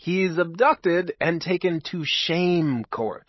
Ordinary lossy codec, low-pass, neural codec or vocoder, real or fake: MP3, 24 kbps; 7.2 kHz; codec, 16 kHz, 16 kbps, FreqCodec, larger model; fake